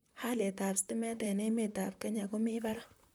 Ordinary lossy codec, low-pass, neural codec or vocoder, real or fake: none; none; vocoder, 44.1 kHz, 128 mel bands, Pupu-Vocoder; fake